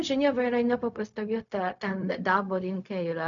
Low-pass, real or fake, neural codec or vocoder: 7.2 kHz; fake; codec, 16 kHz, 0.4 kbps, LongCat-Audio-Codec